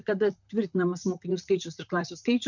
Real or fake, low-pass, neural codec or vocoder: fake; 7.2 kHz; vocoder, 44.1 kHz, 128 mel bands, Pupu-Vocoder